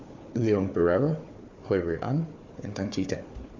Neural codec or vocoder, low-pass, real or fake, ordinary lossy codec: codec, 16 kHz, 4 kbps, FunCodec, trained on Chinese and English, 50 frames a second; 7.2 kHz; fake; MP3, 48 kbps